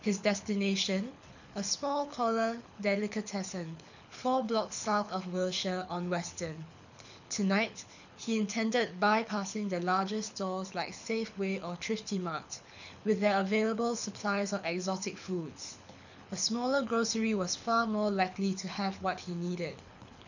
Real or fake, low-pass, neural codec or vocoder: fake; 7.2 kHz; codec, 24 kHz, 6 kbps, HILCodec